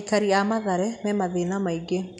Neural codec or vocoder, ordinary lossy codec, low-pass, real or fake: none; none; 10.8 kHz; real